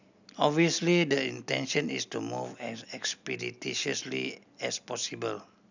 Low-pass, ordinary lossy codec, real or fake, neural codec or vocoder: 7.2 kHz; none; real; none